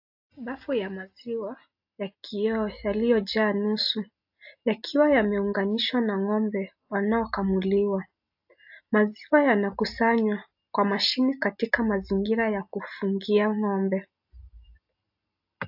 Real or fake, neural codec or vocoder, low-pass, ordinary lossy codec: real; none; 5.4 kHz; AAC, 48 kbps